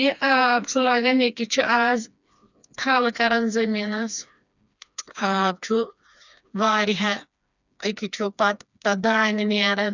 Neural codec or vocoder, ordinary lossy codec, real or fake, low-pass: codec, 16 kHz, 2 kbps, FreqCodec, smaller model; none; fake; 7.2 kHz